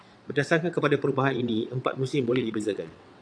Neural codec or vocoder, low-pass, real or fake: vocoder, 22.05 kHz, 80 mel bands, WaveNeXt; 9.9 kHz; fake